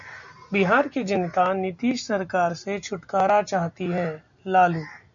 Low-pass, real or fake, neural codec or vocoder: 7.2 kHz; real; none